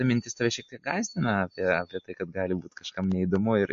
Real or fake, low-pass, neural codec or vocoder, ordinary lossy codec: real; 7.2 kHz; none; MP3, 48 kbps